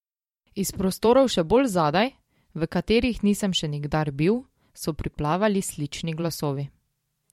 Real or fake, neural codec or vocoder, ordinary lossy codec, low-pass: fake; vocoder, 44.1 kHz, 128 mel bands every 512 samples, BigVGAN v2; MP3, 64 kbps; 19.8 kHz